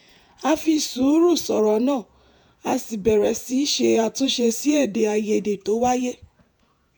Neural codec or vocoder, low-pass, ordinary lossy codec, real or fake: vocoder, 48 kHz, 128 mel bands, Vocos; none; none; fake